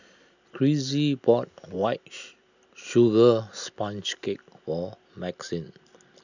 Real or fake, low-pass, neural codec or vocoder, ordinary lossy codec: real; 7.2 kHz; none; none